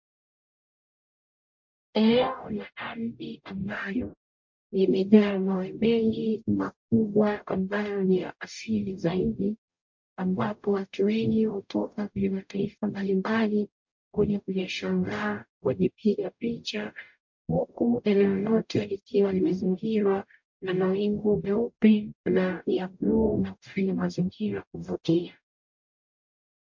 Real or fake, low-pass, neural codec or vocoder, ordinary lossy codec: fake; 7.2 kHz; codec, 44.1 kHz, 0.9 kbps, DAC; MP3, 48 kbps